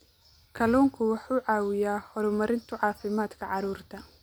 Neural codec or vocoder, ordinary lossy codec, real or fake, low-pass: none; none; real; none